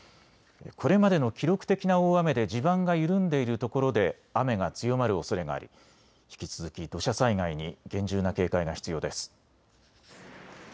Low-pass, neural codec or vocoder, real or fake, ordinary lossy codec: none; none; real; none